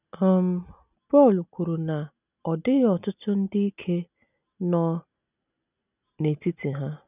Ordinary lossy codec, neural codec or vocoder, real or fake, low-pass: none; none; real; 3.6 kHz